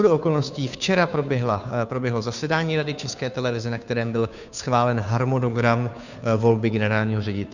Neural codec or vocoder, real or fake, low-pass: codec, 16 kHz, 2 kbps, FunCodec, trained on Chinese and English, 25 frames a second; fake; 7.2 kHz